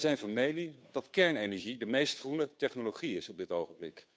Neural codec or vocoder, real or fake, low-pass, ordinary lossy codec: codec, 16 kHz, 2 kbps, FunCodec, trained on Chinese and English, 25 frames a second; fake; none; none